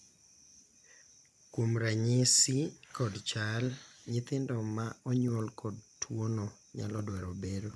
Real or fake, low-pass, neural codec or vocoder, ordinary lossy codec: real; none; none; none